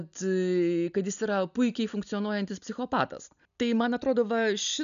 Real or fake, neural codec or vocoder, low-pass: real; none; 7.2 kHz